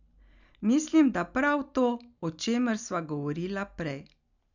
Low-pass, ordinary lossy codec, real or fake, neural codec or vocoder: 7.2 kHz; none; real; none